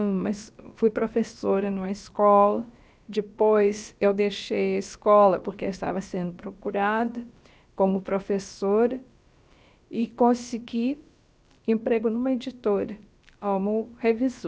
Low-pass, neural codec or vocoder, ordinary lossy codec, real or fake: none; codec, 16 kHz, about 1 kbps, DyCAST, with the encoder's durations; none; fake